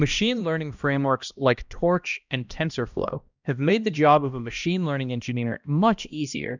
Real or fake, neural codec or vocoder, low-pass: fake; codec, 16 kHz, 1 kbps, X-Codec, HuBERT features, trained on balanced general audio; 7.2 kHz